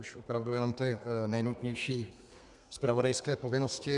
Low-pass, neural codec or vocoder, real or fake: 10.8 kHz; codec, 32 kHz, 1.9 kbps, SNAC; fake